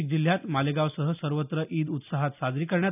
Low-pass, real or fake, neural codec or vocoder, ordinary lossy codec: 3.6 kHz; real; none; none